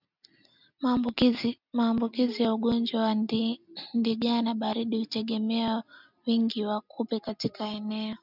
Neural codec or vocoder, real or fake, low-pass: none; real; 5.4 kHz